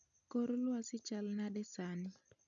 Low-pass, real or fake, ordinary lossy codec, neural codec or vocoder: 7.2 kHz; real; none; none